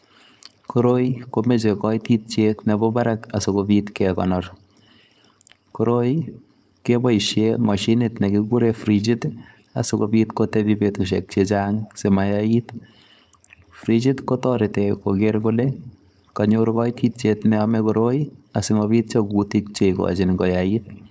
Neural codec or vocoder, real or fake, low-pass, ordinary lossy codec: codec, 16 kHz, 4.8 kbps, FACodec; fake; none; none